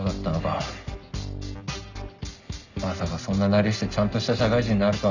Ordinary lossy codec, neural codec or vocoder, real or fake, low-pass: none; none; real; 7.2 kHz